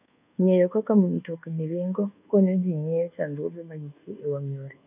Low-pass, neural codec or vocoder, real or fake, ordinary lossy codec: 3.6 kHz; codec, 24 kHz, 1.2 kbps, DualCodec; fake; none